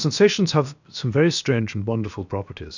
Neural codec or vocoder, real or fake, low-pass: codec, 16 kHz, 0.7 kbps, FocalCodec; fake; 7.2 kHz